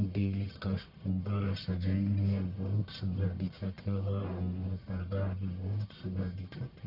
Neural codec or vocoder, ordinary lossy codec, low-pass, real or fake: codec, 44.1 kHz, 1.7 kbps, Pupu-Codec; none; 5.4 kHz; fake